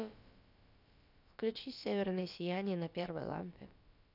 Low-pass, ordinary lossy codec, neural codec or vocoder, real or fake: 5.4 kHz; none; codec, 16 kHz, about 1 kbps, DyCAST, with the encoder's durations; fake